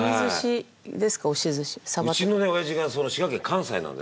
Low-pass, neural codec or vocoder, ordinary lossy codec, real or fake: none; none; none; real